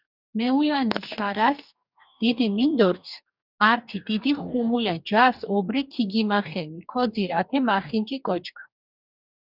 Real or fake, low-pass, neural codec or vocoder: fake; 5.4 kHz; codec, 44.1 kHz, 2.6 kbps, DAC